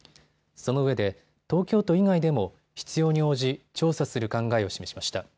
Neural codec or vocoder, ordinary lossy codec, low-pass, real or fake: none; none; none; real